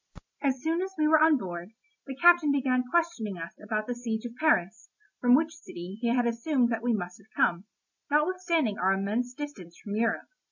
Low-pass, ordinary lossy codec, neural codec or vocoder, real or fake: 7.2 kHz; MP3, 64 kbps; none; real